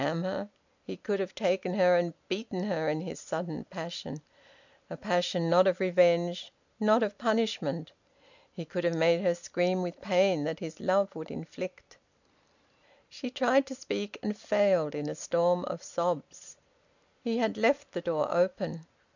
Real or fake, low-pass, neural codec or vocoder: real; 7.2 kHz; none